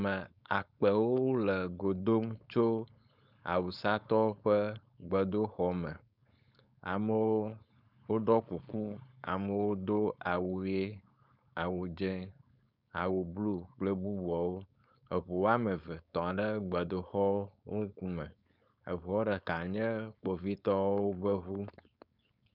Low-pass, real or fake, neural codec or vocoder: 5.4 kHz; fake; codec, 16 kHz, 4.8 kbps, FACodec